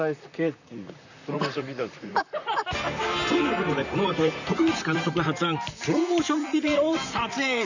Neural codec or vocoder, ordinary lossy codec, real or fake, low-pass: vocoder, 44.1 kHz, 128 mel bands, Pupu-Vocoder; none; fake; 7.2 kHz